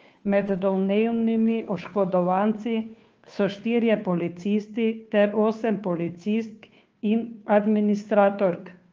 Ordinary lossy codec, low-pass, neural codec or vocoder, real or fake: Opus, 24 kbps; 7.2 kHz; codec, 16 kHz, 2 kbps, FunCodec, trained on Chinese and English, 25 frames a second; fake